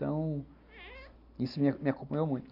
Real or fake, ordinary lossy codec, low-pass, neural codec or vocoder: real; none; 5.4 kHz; none